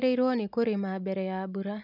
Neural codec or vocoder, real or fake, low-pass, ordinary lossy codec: none; real; 5.4 kHz; none